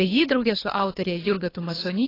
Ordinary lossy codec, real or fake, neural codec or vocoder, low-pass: AAC, 24 kbps; fake; codec, 24 kHz, 3 kbps, HILCodec; 5.4 kHz